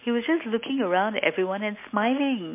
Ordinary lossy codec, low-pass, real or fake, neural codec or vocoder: MP3, 24 kbps; 3.6 kHz; real; none